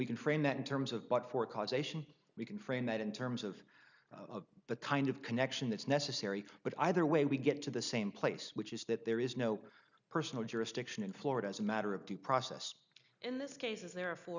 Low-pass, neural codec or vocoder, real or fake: 7.2 kHz; none; real